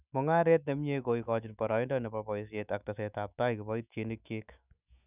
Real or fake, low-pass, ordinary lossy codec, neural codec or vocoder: fake; 3.6 kHz; none; autoencoder, 48 kHz, 128 numbers a frame, DAC-VAE, trained on Japanese speech